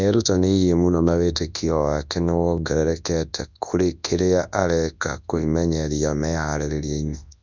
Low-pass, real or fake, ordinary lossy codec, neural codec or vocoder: 7.2 kHz; fake; none; codec, 24 kHz, 0.9 kbps, WavTokenizer, large speech release